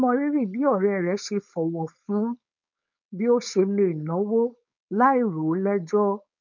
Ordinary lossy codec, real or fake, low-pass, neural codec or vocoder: none; fake; 7.2 kHz; codec, 16 kHz, 4.8 kbps, FACodec